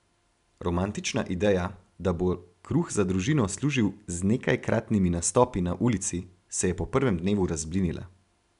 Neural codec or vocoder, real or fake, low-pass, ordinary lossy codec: none; real; 10.8 kHz; none